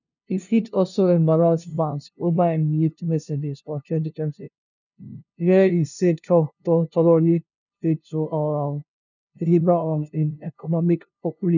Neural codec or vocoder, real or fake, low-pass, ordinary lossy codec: codec, 16 kHz, 0.5 kbps, FunCodec, trained on LibriTTS, 25 frames a second; fake; 7.2 kHz; none